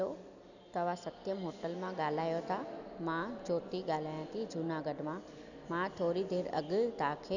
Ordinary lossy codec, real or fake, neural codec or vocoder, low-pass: none; real; none; 7.2 kHz